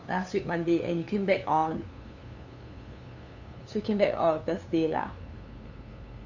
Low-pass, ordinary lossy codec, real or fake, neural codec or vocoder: 7.2 kHz; none; fake; codec, 16 kHz, 2 kbps, FunCodec, trained on LibriTTS, 25 frames a second